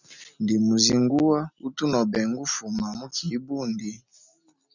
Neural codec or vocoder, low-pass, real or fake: none; 7.2 kHz; real